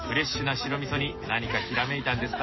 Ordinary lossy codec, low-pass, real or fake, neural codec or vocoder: MP3, 24 kbps; 7.2 kHz; real; none